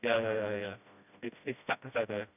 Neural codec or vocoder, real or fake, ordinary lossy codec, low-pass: codec, 16 kHz, 1 kbps, FreqCodec, smaller model; fake; none; 3.6 kHz